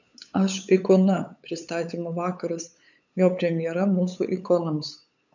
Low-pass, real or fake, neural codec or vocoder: 7.2 kHz; fake; codec, 16 kHz, 8 kbps, FunCodec, trained on LibriTTS, 25 frames a second